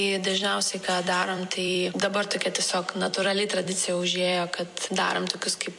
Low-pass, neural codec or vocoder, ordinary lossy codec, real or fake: 10.8 kHz; vocoder, 44.1 kHz, 128 mel bands every 256 samples, BigVGAN v2; MP3, 64 kbps; fake